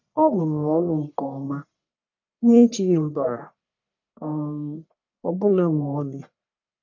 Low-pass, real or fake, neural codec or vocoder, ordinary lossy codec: 7.2 kHz; fake; codec, 44.1 kHz, 1.7 kbps, Pupu-Codec; none